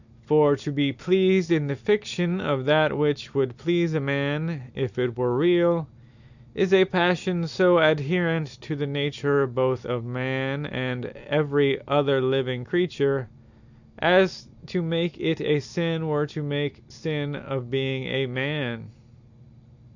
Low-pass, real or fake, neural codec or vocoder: 7.2 kHz; real; none